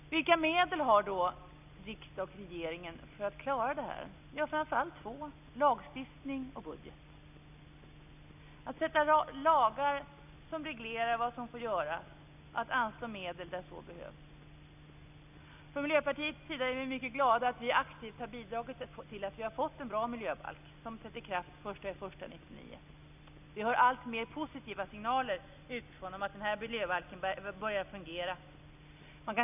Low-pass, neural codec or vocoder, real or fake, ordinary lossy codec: 3.6 kHz; none; real; none